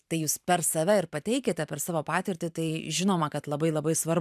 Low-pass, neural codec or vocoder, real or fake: 14.4 kHz; vocoder, 44.1 kHz, 128 mel bands every 512 samples, BigVGAN v2; fake